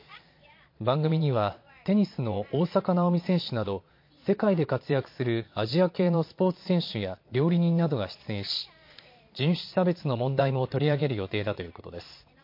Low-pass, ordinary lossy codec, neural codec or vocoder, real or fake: 5.4 kHz; MP3, 32 kbps; vocoder, 22.05 kHz, 80 mel bands, Vocos; fake